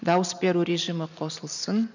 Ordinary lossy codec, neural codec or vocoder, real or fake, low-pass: none; none; real; 7.2 kHz